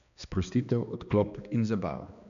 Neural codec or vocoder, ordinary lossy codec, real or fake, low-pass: codec, 16 kHz, 2 kbps, X-Codec, HuBERT features, trained on balanced general audio; none; fake; 7.2 kHz